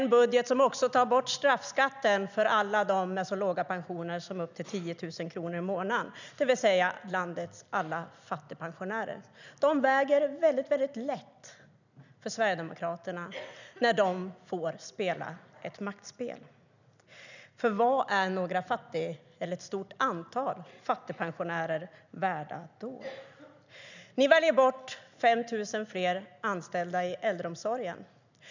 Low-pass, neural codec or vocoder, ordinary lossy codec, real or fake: 7.2 kHz; none; none; real